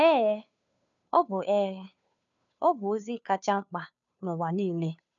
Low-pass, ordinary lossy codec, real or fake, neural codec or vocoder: 7.2 kHz; none; fake; codec, 16 kHz, 2 kbps, FunCodec, trained on Chinese and English, 25 frames a second